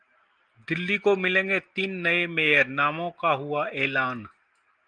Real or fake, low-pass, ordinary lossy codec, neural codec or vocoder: real; 9.9 kHz; Opus, 24 kbps; none